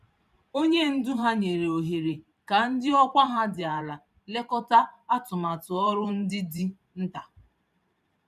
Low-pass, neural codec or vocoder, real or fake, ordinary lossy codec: 14.4 kHz; vocoder, 44.1 kHz, 128 mel bands every 512 samples, BigVGAN v2; fake; none